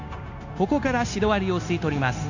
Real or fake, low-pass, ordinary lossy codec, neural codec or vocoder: fake; 7.2 kHz; none; codec, 16 kHz, 0.9 kbps, LongCat-Audio-Codec